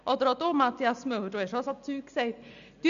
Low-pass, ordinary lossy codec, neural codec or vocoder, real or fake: 7.2 kHz; MP3, 96 kbps; none; real